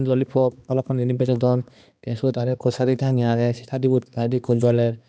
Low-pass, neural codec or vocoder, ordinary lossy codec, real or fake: none; codec, 16 kHz, 2 kbps, X-Codec, HuBERT features, trained on balanced general audio; none; fake